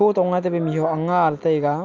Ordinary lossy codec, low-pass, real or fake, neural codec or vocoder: Opus, 32 kbps; 7.2 kHz; real; none